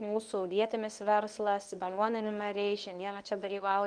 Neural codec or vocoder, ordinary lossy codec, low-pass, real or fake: codec, 24 kHz, 0.9 kbps, WavTokenizer, medium speech release version 1; Opus, 32 kbps; 9.9 kHz; fake